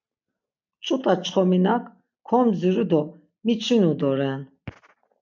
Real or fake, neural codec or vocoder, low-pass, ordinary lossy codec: real; none; 7.2 kHz; MP3, 64 kbps